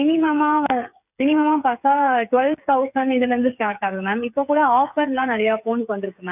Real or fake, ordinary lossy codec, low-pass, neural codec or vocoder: fake; none; 3.6 kHz; codec, 16 kHz, 8 kbps, FreqCodec, smaller model